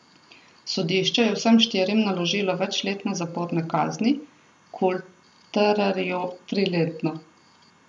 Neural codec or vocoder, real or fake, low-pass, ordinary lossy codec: none; real; 10.8 kHz; none